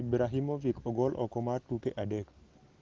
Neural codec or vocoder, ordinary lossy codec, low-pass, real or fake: none; Opus, 16 kbps; 7.2 kHz; real